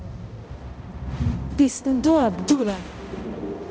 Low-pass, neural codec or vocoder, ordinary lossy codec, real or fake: none; codec, 16 kHz, 0.5 kbps, X-Codec, HuBERT features, trained on balanced general audio; none; fake